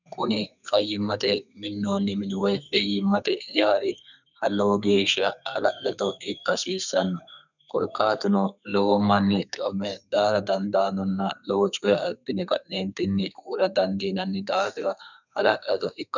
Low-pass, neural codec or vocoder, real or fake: 7.2 kHz; codec, 32 kHz, 1.9 kbps, SNAC; fake